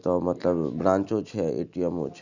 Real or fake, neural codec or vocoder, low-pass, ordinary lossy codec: real; none; 7.2 kHz; none